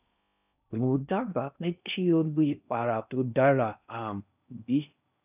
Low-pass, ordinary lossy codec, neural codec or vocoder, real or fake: 3.6 kHz; none; codec, 16 kHz in and 24 kHz out, 0.6 kbps, FocalCodec, streaming, 4096 codes; fake